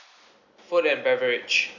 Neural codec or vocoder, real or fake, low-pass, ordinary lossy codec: none; real; 7.2 kHz; none